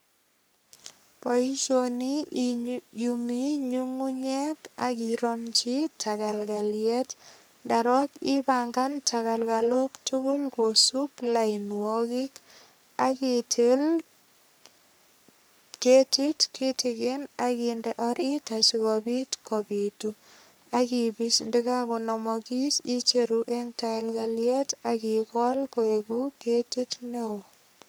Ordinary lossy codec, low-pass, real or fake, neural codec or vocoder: none; none; fake; codec, 44.1 kHz, 3.4 kbps, Pupu-Codec